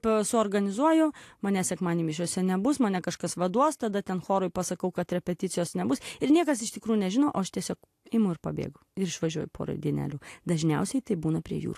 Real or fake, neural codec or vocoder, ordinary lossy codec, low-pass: real; none; AAC, 64 kbps; 14.4 kHz